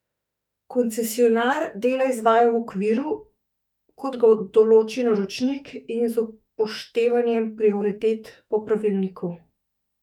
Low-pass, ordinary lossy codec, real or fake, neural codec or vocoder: 19.8 kHz; none; fake; autoencoder, 48 kHz, 32 numbers a frame, DAC-VAE, trained on Japanese speech